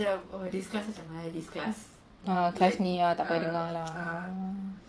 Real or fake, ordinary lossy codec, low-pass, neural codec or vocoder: fake; none; none; vocoder, 22.05 kHz, 80 mel bands, WaveNeXt